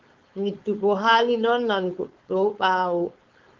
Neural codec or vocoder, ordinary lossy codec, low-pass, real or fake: codec, 16 kHz, 4.8 kbps, FACodec; Opus, 32 kbps; 7.2 kHz; fake